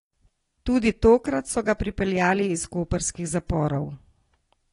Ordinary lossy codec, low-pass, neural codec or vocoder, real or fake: AAC, 32 kbps; 10.8 kHz; none; real